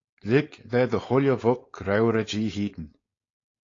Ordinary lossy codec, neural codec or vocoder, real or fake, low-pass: AAC, 32 kbps; codec, 16 kHz, 4.8 kbps, FACodec; fake; 7.2 kHz